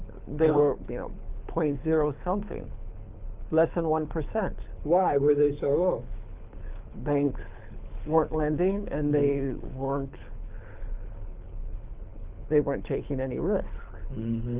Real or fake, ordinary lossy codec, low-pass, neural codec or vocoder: fake; Opus, 32 kbps; 3.6 kHz; codec, 24 kHz, 6 kbps, HILCodec